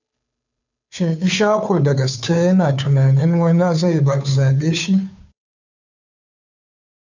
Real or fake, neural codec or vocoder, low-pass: fake; codec, 16 kHz, 2 kbps, FunCodec, trained on Chinese and English, 25 frames a second; 7.2 kHz